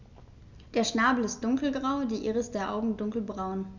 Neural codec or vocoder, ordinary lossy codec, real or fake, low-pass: none; none; real; 7.2 kHz